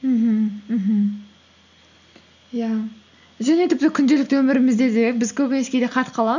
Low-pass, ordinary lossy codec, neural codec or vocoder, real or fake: 7.2 kHz; none; none; real